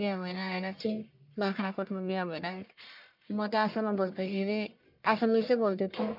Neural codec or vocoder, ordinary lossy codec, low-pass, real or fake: codec, 44.1 kHz, 1.7 kbps, Pupu-Codec; MP3, 48 kbps; 5.4 kHz; fake